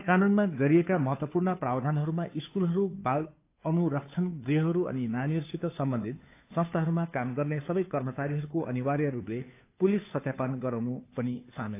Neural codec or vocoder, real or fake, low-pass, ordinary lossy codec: codec, 16 kHz, 2 kbps, FunCodec, trained on Chinese and English, 25 frames a second; fake; 3.6 kHz; AAC, 24 kbps